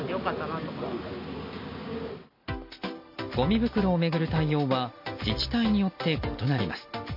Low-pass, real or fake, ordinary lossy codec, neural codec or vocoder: 5.4 kHz; real; MP3, 32 kbps; none